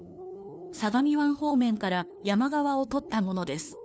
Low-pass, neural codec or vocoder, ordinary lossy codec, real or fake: none; codec, 16 kHz, 2 kbps, FunCodec, trained on LibriTTS, 25 frames a second; none; fake